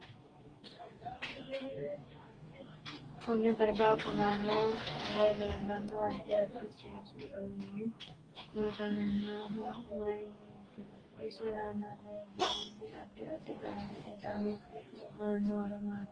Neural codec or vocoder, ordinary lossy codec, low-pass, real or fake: codec, 44.1 kHz, 2.6 kbps, DAC; Opus, 24 kbps; 9.9 kHz; fake